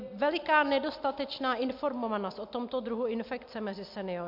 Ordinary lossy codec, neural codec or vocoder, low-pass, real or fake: MP3, 48 kbps; none; 5.4 kHz; real